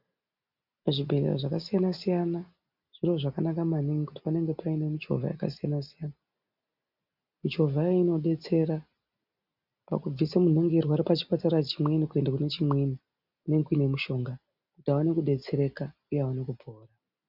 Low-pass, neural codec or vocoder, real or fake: 5.4 kHz; none; real